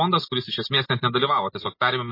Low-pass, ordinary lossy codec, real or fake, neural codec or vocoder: 5.4 kHz; MP3, 24 kbps; real; none